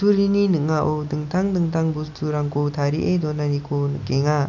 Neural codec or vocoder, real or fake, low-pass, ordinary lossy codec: none; real; 7.2 kHz; none